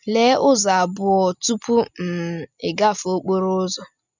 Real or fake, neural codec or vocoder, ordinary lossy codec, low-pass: real; none; none; 7.2 kHz